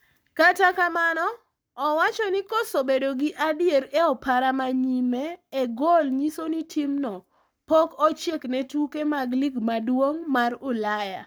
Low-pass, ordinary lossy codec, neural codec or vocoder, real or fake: none; none; codec, 44.1 kHz, 7.8 kbps, Pupu-Codec; fake